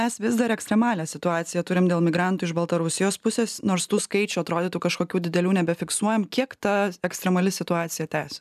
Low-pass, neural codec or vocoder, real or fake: 14.4 kHz; none; real